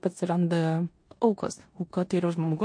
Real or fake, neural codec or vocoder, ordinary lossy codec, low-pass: fake; codec, 16 kHz in and 24 kHz out, 0.9 kbps, LongCat-Audio-Codec, four codebook decoder; MP3, 48 kbps; 9.9 kHz